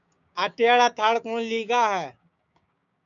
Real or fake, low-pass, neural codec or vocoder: fake; 7.2 kHz; codec, 16 kHz, 6 kbps, DAC